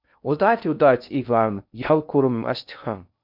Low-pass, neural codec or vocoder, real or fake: 5.4 kHz; codec, 16 kHz in and 24 kHz out, 0.6 kbps, FocalCodec, streaming, 2048 codes; fake